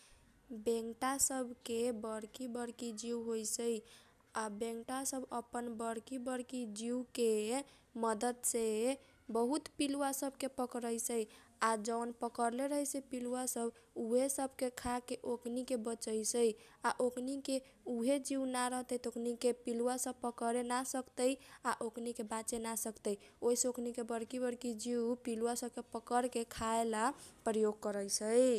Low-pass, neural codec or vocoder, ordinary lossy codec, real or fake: 14.4 kHz; none; none; real